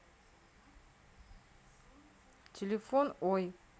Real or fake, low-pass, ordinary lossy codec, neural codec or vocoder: real; none; none; none